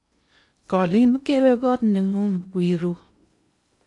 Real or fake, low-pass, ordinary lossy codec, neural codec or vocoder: fake; 10.8 kHz; AAC, 64 kbps; codec, 16 kHz in and 24 kHz out, 0.6 kbps, FocalCodec, streaming, 2048 codes